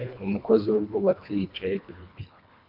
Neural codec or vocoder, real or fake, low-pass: codec, 24 kHz, 1.5 kbps, HILCodec; fake; 5.4 kHz